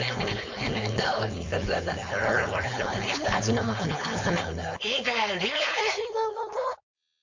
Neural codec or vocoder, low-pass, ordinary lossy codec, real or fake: codec, 16 kHz, 4.8 kbps, FACodec; 7.2 kHz; MP3, 48 kbps; fake